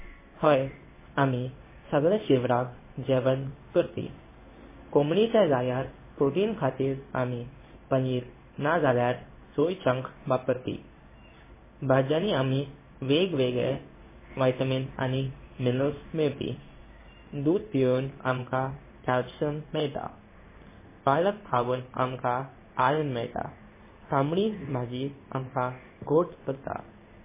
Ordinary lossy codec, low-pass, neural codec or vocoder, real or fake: MP3, 16 kbps; 3.6 kHz; codec, 16 kHz in and 24 kHz out, 1 kbps, XY-Tokenizer; fake